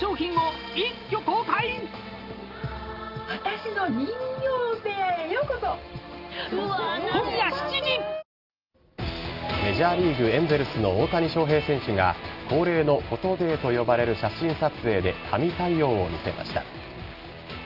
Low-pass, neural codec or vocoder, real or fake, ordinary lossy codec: 5.4 kHz; none; real; Opus, 32 kbps